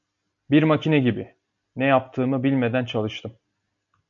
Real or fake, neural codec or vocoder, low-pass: real; none; 7.2 kHz